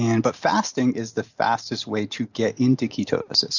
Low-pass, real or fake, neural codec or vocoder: 7.2 kHz; real; none